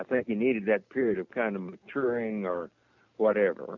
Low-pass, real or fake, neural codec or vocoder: 7.2 kHz; real; none